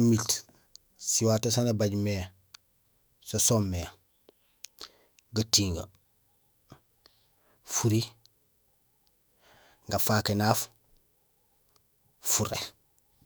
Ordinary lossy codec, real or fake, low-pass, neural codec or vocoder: none; fake; none; autoencoder, 48 kHz, 128 numbers a frame, DAC-VAE, trained on Japanese speech